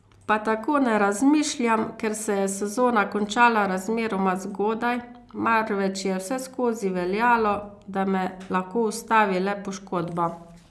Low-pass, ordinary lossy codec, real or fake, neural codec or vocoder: none; none; real; none